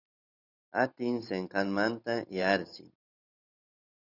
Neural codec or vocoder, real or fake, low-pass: vocoder, 44.1 kHz, 128 mel bands every 512 samples, BigVGAN v2; fake; 5.4 kHz